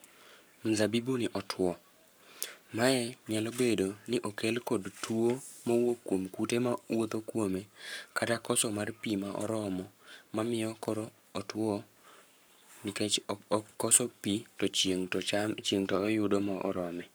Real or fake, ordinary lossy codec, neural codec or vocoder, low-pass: fake; none; codec, 44.1 kHz, 7.8 kbps, Pupu-Codec; none